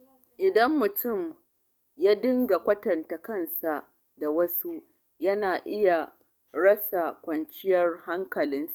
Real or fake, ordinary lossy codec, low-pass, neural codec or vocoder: fake; none; 19.8 kHz; codec, 44.1 kHz, 7.8 kbps, DAC